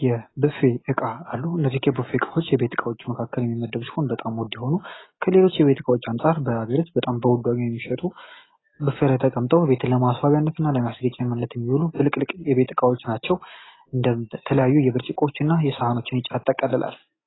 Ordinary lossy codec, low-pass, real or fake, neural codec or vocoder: AAC, 16 kbps; 7.2 kHz; real; none